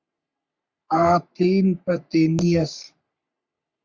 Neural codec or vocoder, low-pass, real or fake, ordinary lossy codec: codec, 44.1 kHz, 3.4 kbps, Pupu-Codec; 7.2 kHz; fake; Opus, 64 kbps